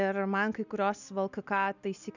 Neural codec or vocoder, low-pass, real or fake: none; 7.2 kHz; real